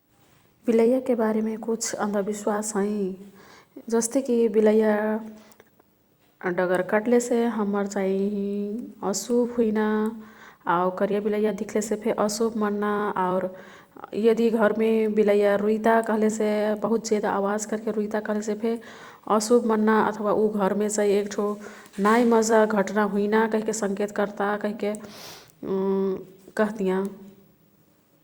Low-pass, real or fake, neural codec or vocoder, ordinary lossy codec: 19.8 kHz; fake; vocoder, 44.1 kHz, 128 mel bands every 256 samples, BigVGAN v2; Opus, 64 kbps